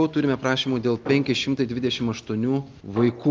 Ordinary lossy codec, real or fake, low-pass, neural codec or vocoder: Opus, 24 kbps; real; 7.2 kHz; none